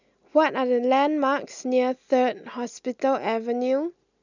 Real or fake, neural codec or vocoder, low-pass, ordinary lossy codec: real; none; 7.2 kHz; none